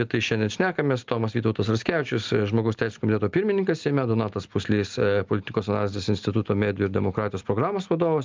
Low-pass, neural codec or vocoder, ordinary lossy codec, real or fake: 7.2 kHz; none; Opus, 32 kbps; real